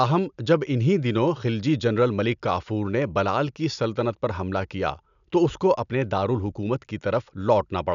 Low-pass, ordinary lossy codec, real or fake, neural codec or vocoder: 7.2 kHz; none; real; none